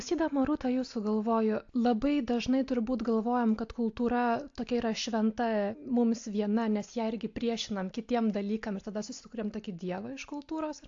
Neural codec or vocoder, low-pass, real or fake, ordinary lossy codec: none; 7.2 kHz; real; AAC, 48 kbps